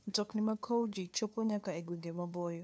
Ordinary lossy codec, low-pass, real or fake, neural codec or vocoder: none; none; fake; codec, 16 kHz, 2 kbps, FunCodec, trained on LibriTTS, 25 frames a second